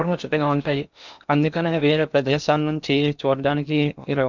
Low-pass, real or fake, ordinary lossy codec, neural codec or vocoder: 7.2 kHz; fake; none; codec, 16 kHz in and 24 kHz out, 0.8 kbps, FocalCodec, streaming, 65536 codes